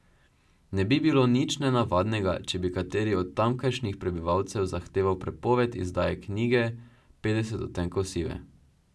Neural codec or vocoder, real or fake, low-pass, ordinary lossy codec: none; real; none; none